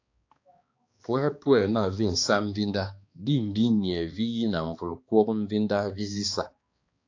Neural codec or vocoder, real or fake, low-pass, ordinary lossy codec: codec, 16 kHz, 2 kbps, X-Codec, HuBERT features, trained on balanced general audio; fake; 7.2 kHz; AAC, 48 kbps